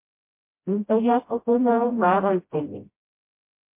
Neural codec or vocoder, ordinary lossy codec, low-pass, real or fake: codec, 16 kHz, 0.5 kbps, FreqCodec, smaller model; MP3, 24 kbps; 3.6 kHz; fake